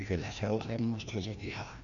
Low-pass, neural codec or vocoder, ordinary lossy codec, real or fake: 7.2 kHz; codec, 16 kHz, 1 kbps, FreqCodec, larger model; none; fake